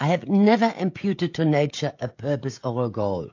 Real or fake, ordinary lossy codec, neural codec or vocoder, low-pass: fake; AAC, 48 kbps; codec, 16 kHz, 16 kbps, FreqCodec, smaller model; 7.2 kHz